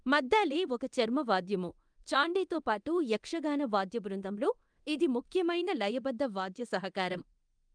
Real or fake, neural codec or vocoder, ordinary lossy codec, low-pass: fake; codec, 24 kHz, 0.9 kbps, DualCodec; Opus, 32 kbps; 9.9 kHz